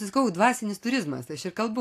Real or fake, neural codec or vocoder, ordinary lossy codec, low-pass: real; none; AAC, 64 kbps; 14.4 kHz